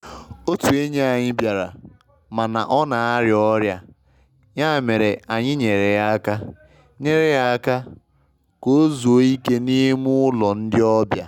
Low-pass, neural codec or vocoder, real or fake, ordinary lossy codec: 19.8 kHz; none; real; none